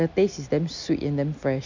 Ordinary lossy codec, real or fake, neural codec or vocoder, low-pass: MP3, 64 kbps; real; none; 7.2 kHz